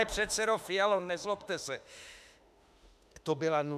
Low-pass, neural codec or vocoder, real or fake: 14.4 kHz; autoencoder, 48 kHz, 32 numbers a frame, DAC-VAE, trained on Japanese speech; fake